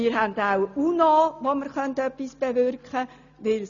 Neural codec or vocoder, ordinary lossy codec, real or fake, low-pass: none; none; real; 7.2 kHz